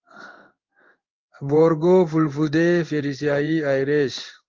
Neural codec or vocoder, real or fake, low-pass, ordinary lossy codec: codec, 16 kHz in and 24 kHz out, 1 kbps, XY-Tokenizer; fake; 7.2 kHz; Opus, 32 kbps